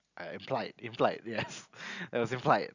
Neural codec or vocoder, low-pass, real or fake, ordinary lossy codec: none; 7.2 kHz; real; none